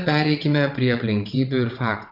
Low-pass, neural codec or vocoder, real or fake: 5.4 kHz; vocoder, 22.05 kHz, 80 mel bands, WaveNeXt; fake